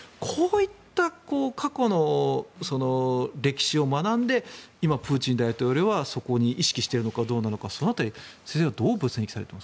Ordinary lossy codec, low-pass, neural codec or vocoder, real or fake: none; none; none; real